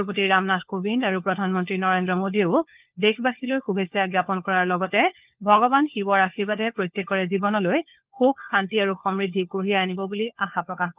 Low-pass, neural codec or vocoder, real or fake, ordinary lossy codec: 3.6 kHz; codec, 16 kHz, 4 kbps, FunCodec, trained on LibriTTS, 50 frames a second; fake; Opus, 32 kbps